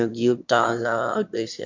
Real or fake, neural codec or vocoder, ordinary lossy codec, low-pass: fake; autoencoder, 22.05 kHz, a latent of 192 numbers a frame, VITS, trained on one speaker; MP3, 48 kbps; 7.2 kHz